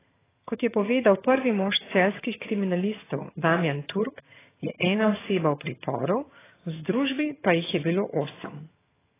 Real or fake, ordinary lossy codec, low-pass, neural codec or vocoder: fake; AAC, 16 kbps; 3.6 kHz; vocoder, 22.05 kHz, 80 mel bands, HiFi-GAN